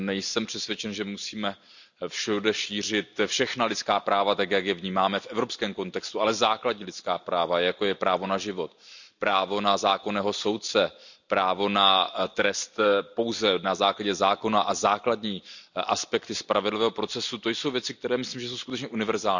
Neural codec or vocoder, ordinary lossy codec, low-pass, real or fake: none; none; 7.2 kHz; real